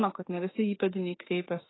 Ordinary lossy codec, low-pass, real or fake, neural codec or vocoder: AAC, 16 kbps; 7.2 kHz; fake; autoencoder, 48 kHz, 32 numbers a frame, DAC-VAE, trained on Japanese speech